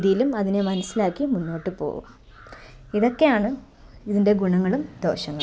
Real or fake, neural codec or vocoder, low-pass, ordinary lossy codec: real; none; none; none